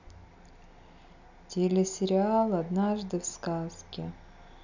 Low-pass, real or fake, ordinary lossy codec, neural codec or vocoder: 7.2 kHz; real; none; none